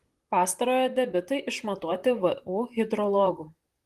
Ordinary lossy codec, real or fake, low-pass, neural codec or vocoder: Opus, 24 kbps; fake; 14.4 kHz; vocoder, 44.1 kHz, 128 mel bands, Pupu-Vocoder